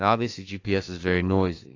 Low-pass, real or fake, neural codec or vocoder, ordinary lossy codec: 7.2 kHz; fake; autoencoder, 48 kHz, 32 numbers a frame, DAC-VAE, trained on Japanese speech; AAC, 32 kbps